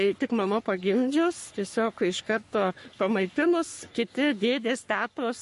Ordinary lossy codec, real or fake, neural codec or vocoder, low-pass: MP3, 48 kbps; fake; codec, 44.1 kHz, 3.4 kbps, Pupu-Codec; 14.4 kHz